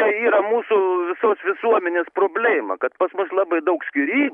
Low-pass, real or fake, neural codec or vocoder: 10.8 kHz; real; none